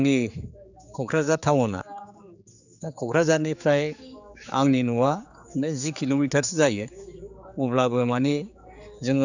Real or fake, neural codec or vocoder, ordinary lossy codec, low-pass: fake; codec, 16 kHz, 4 kbps, X-Codec, HuBERT features, trained on general audio; none; 7.2 kHz